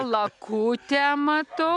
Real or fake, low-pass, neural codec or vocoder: real; 10.8 kHz; none